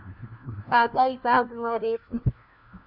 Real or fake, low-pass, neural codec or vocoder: fake; 5.4 kHz; codec, 16 kHz, 1 kbps, FunCodec, trained on LibriTTS, 50 frames a second